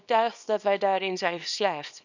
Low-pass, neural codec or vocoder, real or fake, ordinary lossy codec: 7.2 kHz; codec, 24 kHz, 0.9 kbps, WavTokenizer, small release; fake; none